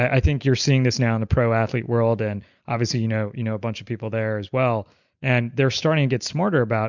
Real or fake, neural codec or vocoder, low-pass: real; none; 7.2 kHz